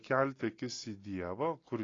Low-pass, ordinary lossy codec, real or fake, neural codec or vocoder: 7.2 kHz; AAC, 32 kbps; real; none